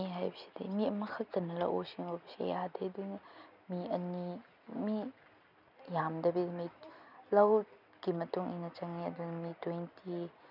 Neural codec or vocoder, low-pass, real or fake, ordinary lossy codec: none; 5.4 kHz; real; none